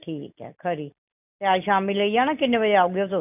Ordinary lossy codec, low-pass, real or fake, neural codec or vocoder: none; 3.6 kHz; real; none